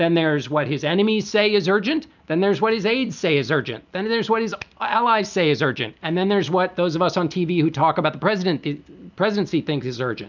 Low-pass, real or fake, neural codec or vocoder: 7.2 kHz; real; none